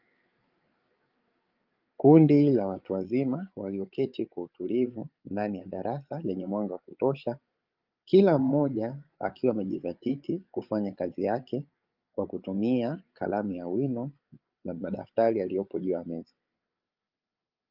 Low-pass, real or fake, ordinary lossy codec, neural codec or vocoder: 5.4 kHz; fake; Opus, 24 kbps; codec, 16 kHz, 8 kbps, FreqCodec, larger model